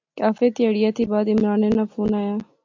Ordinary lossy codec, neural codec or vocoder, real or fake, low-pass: AAC, 48 kbps; none; real; 7.2 kHz